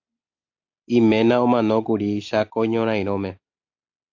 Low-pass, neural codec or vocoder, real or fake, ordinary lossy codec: 7.2 kHz; none; real; AAC, 48 kbps